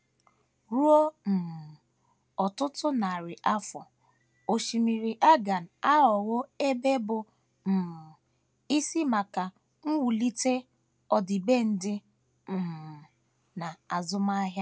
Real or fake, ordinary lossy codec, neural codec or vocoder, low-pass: real; none; none; none